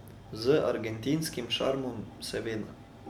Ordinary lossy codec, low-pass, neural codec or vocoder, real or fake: none; 19.8 kHz; none; real